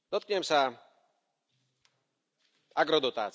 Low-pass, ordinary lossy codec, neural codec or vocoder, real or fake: none; none; none; real